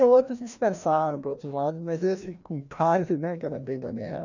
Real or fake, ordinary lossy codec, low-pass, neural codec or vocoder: fake; none; 7.2 kHz; codec, 16 kHz, 1 kbps, FreqCodec, larger model